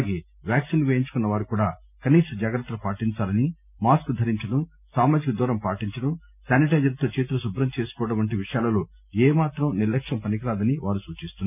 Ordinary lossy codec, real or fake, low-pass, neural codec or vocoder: none; real; 3.6 kHz; none